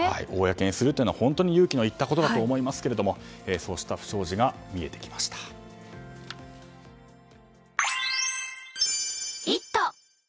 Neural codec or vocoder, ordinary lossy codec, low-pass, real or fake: none; none; none; real